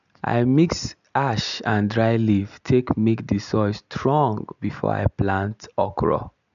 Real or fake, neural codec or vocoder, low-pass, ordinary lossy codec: real; none; 7.2 kHz; none